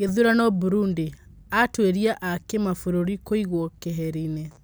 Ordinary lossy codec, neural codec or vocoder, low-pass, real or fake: none; none; none; real